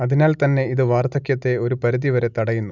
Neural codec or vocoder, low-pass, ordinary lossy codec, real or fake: none; 7.2 kHz; none; real